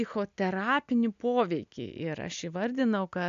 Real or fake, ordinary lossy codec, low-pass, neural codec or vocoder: real; AAC, 64 kbps; 7.2 kHz; none